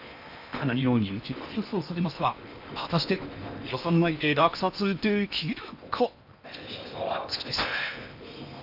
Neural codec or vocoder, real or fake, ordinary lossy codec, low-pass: codec, 16 kHz in and 24 kHz out, 0.8 kbps, FocalCodec, streaming, 65536 codes; fake; none; 5.4 kHz